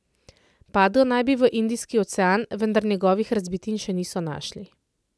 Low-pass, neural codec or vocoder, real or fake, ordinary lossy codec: none; none; real; none